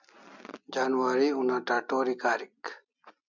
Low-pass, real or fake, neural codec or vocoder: 7.2 kHz; real; none